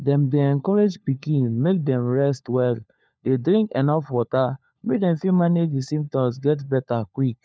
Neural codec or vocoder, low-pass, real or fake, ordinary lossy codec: codec, 16 kHz, 4 kbps, FunCodec, trained on LibriTTS, 50 frames a second; none; fake; none